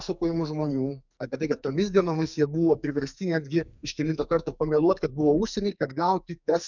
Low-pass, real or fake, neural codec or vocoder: 7.2 kHz; fake; codec, 44.1 kHz, 2.6 kbps, SNAC